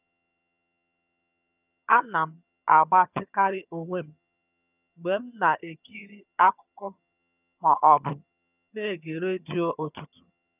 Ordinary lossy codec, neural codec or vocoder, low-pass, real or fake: none; vocoder, 22.05 kHz, 80 mel bands, HiFi-GAN; 3.6 kHz; fake